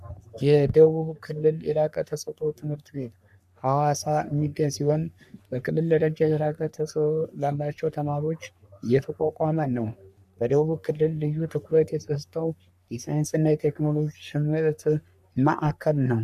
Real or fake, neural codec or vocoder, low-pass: fake; codec, 44.1 kHz, 3.4 kbps, Pupu-Codec; 14.4 kHz